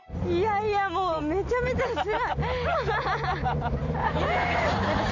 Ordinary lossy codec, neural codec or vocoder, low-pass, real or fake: none; none; 7.2 kHz; real